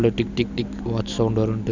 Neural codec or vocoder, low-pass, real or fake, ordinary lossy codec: none; 7.2 kHz; real; none